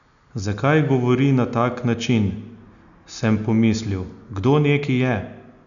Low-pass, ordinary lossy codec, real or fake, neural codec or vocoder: 7.2 kHz; none; real; none